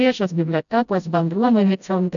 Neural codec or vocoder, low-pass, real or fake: codec, 16 kHz, 0.5 kbps, FreqCodec, smaller model; 7.2 kHz; fake